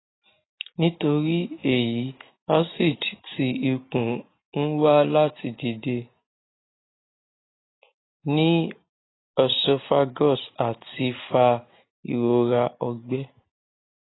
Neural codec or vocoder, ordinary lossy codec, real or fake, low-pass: none; AAC, 16 kbps; real; 7.2 kHz